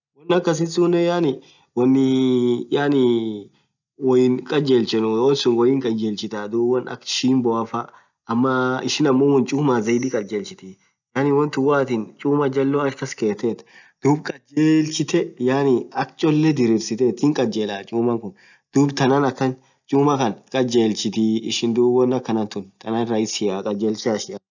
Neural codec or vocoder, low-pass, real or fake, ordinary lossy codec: none; 7.2 kHz; real; none